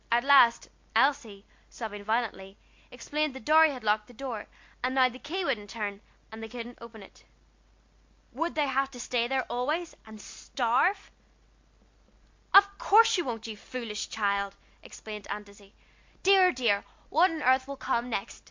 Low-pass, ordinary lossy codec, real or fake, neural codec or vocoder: 7.2 kHz; MP3, 48 kbps; real; none